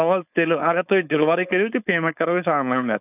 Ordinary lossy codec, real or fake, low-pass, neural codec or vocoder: none; fake; 3.6 kHz; codec, 16 kHz, 4.8 kbps, FACodec